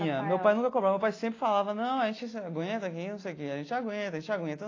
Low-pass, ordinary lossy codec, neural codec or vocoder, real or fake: 7.2 kHz; AAC, 32 kbps; none; real